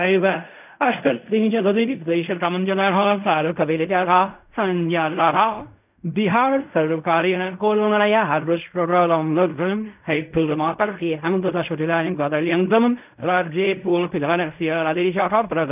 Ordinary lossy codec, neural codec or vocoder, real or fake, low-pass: none; codec, 16 kHz in and 24 kHz out, 0.4 kbps, LongCat-Audio-Codec, fine tuned four codebook decoder; fake; 3.6 kHz